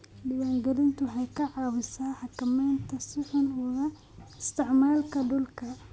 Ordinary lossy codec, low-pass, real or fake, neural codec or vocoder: none; none; real; none